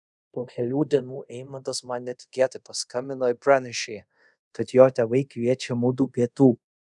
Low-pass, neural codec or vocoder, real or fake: 10.8 kHz; codec, 24 kHz, 0.5 kbps, DualCodec; fake